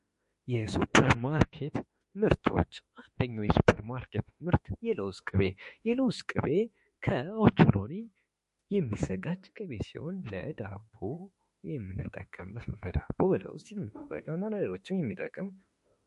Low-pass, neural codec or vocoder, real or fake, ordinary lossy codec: 14.4 kHz; autoencoder, 48 kHz, 32 numbers a frame, DAC-VAE, trained on Japanese speech; fake; MP3, 48 kbps